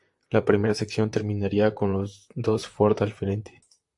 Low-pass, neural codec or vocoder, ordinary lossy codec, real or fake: 10.8 kHz; vocoder, 44.1 kHz, 128 mel bands, Pupu-Vocoder; AAC, 64 kbps; fake